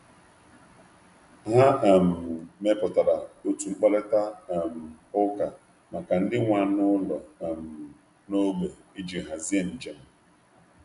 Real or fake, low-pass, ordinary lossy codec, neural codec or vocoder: real; 10.8 kHz; none; none